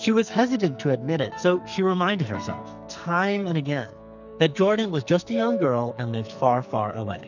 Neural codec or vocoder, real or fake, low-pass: codec, 44.1 kHz, 2.6 kbps, SNAC; fake; 7.2 kHz